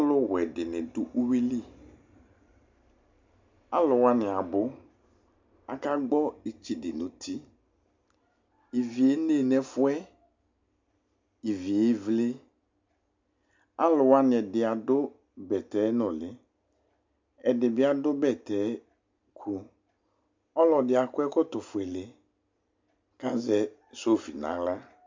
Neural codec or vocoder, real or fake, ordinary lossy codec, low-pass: none; real; MP3, 64 kbps; 7.2 kHz